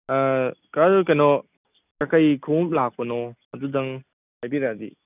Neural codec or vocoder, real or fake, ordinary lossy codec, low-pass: none; real; none; 3.6 kHz